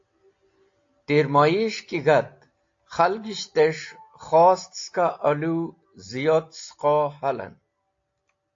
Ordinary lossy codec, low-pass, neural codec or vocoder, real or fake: AAC, 48 kbps; 7.2 kHz; none; real